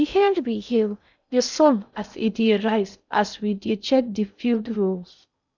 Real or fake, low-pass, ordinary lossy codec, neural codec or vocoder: fake; 7.2 kHz; none; codec, 16 kHz in and 24 kHz out, 0.6 kbps, FocalCodec, streaming, 2048 codes